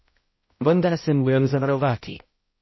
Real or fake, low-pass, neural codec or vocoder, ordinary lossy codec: fake; 7.2 kHz; codec, 16 kHz, 0.5 kbps, X-Codec, HuBERT features, trained on balanced general audio; MP3, 24 kbps